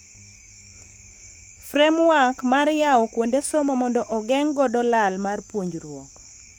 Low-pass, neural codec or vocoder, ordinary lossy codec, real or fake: none; codec, 44.1 kHz, 7.8 kbps, DAC; none; fake